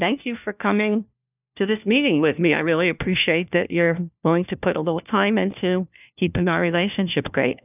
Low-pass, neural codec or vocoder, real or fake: 3.6 kHz; codec, 16 kHz, 1 kbps, FunCodec, trained on LibriTTS, 50 frames a second; fake